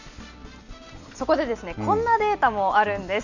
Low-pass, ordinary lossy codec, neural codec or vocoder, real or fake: 7.2 kHz; none; none; real